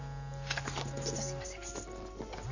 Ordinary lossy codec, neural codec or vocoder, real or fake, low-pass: none; none; real; 7.2 kHz